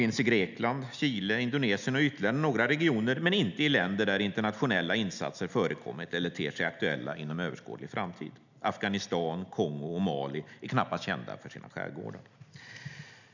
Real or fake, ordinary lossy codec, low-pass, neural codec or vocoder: real; none; 7.2 kHz; none